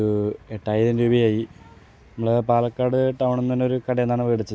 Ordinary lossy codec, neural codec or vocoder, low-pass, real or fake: none; none; none; real